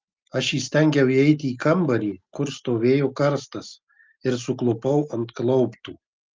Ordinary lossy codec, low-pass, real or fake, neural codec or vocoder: Opus, 24 kbps; 7.2 kHz; real; none